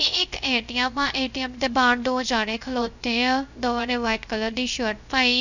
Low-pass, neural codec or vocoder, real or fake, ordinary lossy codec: 7.2 kHz; codec, 16 kHz, 0.3 kbps, FocalCodec; fake; none